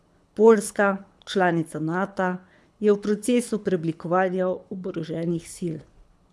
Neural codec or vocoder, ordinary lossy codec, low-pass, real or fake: codec, 24 kHz, 6 kbps, HILCodec; none; none; fake